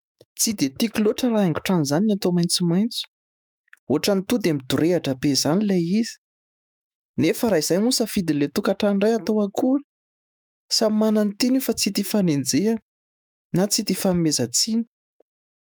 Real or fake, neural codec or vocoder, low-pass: fake; autoencoder, 48 kHz, 128 numbers a frame, DAC-VAE, trained on Japanese speech; 19.8 kHz